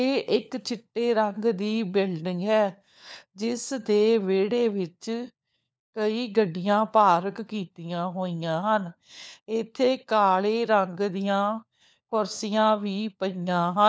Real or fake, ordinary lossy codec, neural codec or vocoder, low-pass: fake; none; codec, 16 kHz, 4 kbps, FunCodec, trained on LibriTTS, 50 frames a second; none